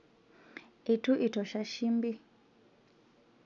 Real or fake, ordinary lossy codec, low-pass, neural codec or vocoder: real; none; 7.2 kHz; none